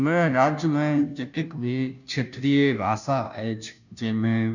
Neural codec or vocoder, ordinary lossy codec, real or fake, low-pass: codec, 16 kHz, 0.5 kbps, FunCodec, trained on Chinese and English, 25 frames a second; none; fake; 7.2 kHz